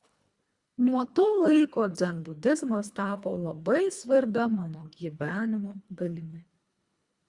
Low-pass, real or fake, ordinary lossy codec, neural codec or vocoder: 10.8 kHz; fake; Opus, 64 kbps; codec, 24 kHz, 1.5 kbps, HILCodec